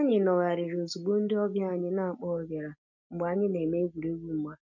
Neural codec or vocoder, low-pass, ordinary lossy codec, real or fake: none; 7.2 kHz; none; real